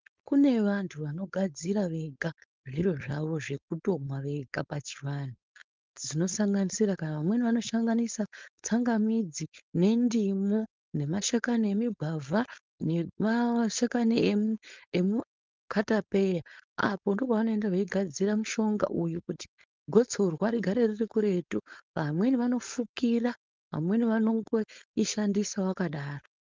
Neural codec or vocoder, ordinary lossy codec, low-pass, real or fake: codec, 16 kHz, 4.8 kbps, FACodec; Opus, 24 kbps; 7.2 kHz; fake